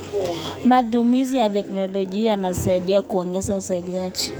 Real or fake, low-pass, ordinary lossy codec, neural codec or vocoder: fake; none; none; codec, 44.1 kHz, 2.6 kbps, SNAC